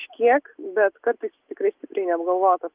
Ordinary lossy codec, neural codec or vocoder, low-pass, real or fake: Opus, 24 kbps; none; 3.6 kHz; real